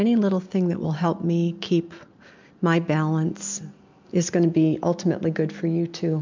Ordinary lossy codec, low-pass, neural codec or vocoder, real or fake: MP3, 64 kbps; 7.2 kHz; none; real